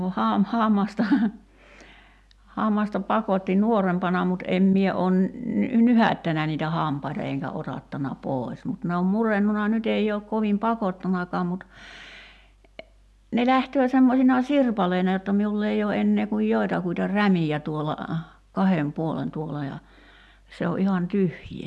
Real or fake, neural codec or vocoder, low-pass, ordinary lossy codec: real; none; none; none